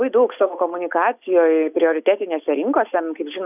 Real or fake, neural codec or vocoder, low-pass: real; none; 3.6 kHz